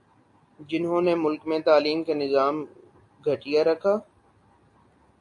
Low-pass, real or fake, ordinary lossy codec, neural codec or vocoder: 10.8 kHz; real; AAC, 48 kbps; none